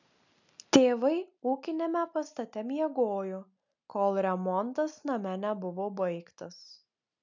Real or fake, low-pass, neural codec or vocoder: real; 7.2 kHz; none